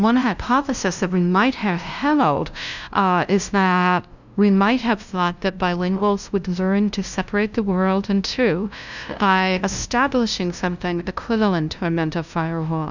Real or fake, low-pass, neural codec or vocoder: fake; 7.2 kHz; codec, 16 kHz, 0.5 kbps, FunCodec, trained on LibriTTS, 25 frames a second